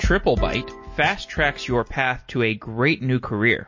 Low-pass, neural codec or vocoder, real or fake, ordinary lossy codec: 7.2 kHz; none; real; MP3, 32 kbps